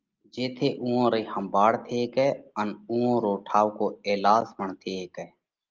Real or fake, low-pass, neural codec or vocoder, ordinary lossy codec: real; 7.2 kHz; none; Opus, 32 kbps